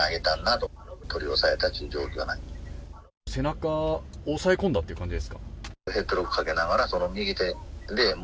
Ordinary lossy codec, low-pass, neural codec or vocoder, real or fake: none; none; none; real